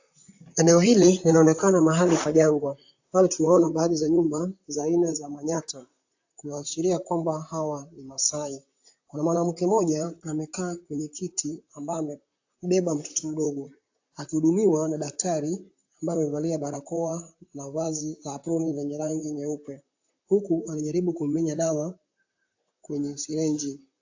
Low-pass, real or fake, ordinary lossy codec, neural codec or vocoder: 7.2 kHz; fake; AAC, 48 kbps; vocoder, 44.1 kHz, 128 mel bands, Pupu-Vocoder